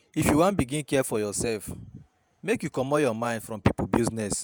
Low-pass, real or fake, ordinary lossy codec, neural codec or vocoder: none; fake; none; vocoder, 48 kHz, 128 mel bands, Vocos